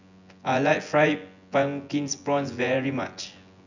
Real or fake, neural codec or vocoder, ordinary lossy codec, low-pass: fake; vocoder, 24 kHz, 100 mel bands, Vocos; none; 7.2 kHz